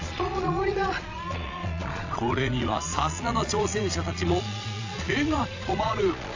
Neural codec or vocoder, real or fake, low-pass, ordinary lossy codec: vocoder, 22.05 kHz, 80 mel bands, Vocos; fake; 7.2 kHz; AAC, 48 kbps